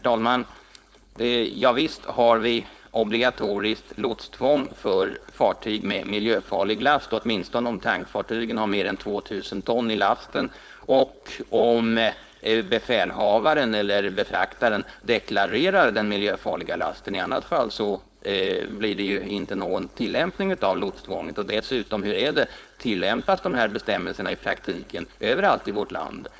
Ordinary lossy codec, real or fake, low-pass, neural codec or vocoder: none; fake; none; codec, 16 kHz, 4.8 kbps, FACodec